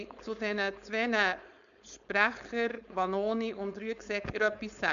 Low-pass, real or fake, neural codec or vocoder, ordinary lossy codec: 7.2 kHz; fake; codec, 16 kHz, 4.8 kbps, FACodec; none